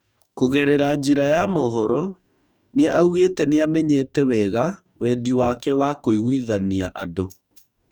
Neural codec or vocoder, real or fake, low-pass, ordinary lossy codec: codec, 44.1 kHz, 2.6 kbps, DAC; fake; 19.8 kHz; none